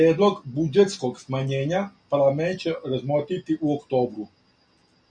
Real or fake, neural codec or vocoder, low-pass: real; none; 9.9 kHz